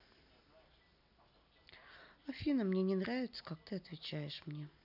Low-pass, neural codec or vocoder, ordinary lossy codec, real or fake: 5.4 kHz; none; none; real